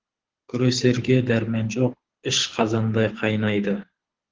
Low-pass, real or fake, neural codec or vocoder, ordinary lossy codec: 7.2 kHz; fake; codec, 24 kHz, 6 kbps, HILCodec; Opus, 16 kbps